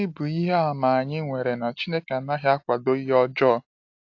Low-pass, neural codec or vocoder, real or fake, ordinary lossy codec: 7.2 kHz; none; real; AAC, 48 kbps